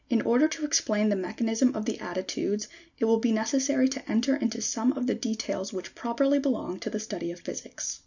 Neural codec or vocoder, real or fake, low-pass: none; real; 7.2 kHz